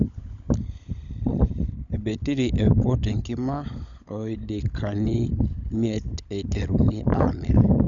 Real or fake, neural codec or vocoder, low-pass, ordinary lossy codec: fake; codec, 16 kHz, 16 kbps, FunCodec, trained on LibriTTS, 50 frames a second; 7.2 kHz; none